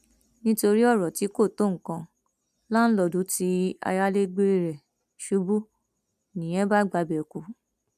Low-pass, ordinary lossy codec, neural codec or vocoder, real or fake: 14.4 kHz; none; none; real